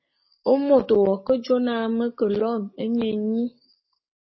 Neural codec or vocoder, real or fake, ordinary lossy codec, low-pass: codec, 44.1 kHz, 7.8 kbps, DAC; fake; MP3, 24 kbps; 7.2 kHz